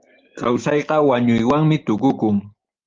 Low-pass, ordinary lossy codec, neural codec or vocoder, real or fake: 7.2 kHz; Opus, 32 kbps; none; real